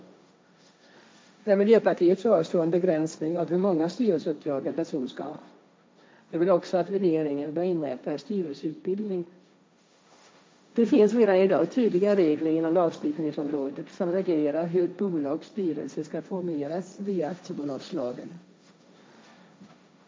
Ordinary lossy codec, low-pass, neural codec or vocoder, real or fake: none; none; codec, 16 kHz, 1.1 kbps, Voila-Tokenizer; fake